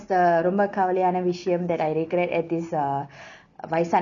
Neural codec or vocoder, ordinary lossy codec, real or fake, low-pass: none; none; real; 7.2 kHz